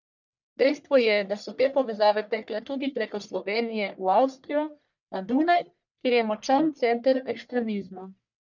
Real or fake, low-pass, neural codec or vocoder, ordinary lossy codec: fake; 7.2 kHz; codec, 44.1 kHz, 1.7 kbps, Pupu-Codec; none